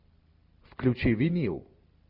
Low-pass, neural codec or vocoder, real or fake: 5.4 kHz; none; real